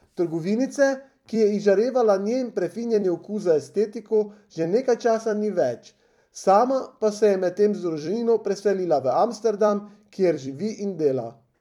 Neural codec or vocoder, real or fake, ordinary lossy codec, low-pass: vocoder, 44.1 kHz, 128 mel bands every 256 samples, BigVGAN v2; fake; none; 19.8 kHz